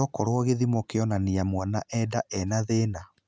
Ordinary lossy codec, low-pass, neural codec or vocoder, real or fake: none; none; none; real